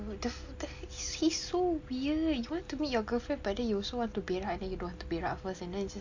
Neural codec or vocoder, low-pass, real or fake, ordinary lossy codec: none; 7.2 kHz; real; MP3, 48 kbps